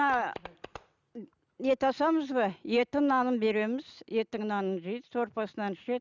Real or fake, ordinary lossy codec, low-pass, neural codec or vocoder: real; none; 7.2 kHz; none